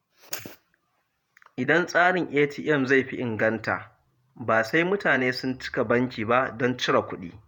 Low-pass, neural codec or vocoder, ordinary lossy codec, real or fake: 19.8 kHz; vocoder, 48 kHz, 128 mel bands, Vocos; none; fake